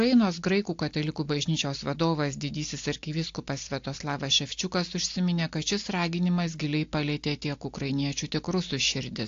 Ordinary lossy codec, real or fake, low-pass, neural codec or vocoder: AAC, 48 kbps; real; 7.2 kHz; none